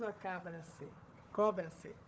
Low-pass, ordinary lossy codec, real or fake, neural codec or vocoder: none; none; fake; codec, 16 kHz, 4 kbps, FunCodec, trained on Chinese and English, 50 frames a second